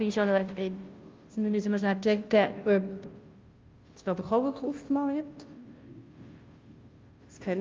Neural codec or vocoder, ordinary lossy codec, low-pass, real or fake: codec, 16 kHz, 0.5 kbps, FunCodec, trained on Chinese and English, 25 frames a second; Opus, 24 kbps; 7.2 kHz; fake